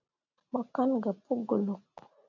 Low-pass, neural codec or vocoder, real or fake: 7.2 kHz; none; real